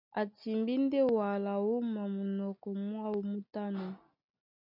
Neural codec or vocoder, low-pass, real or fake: none; 5.4 kHz; real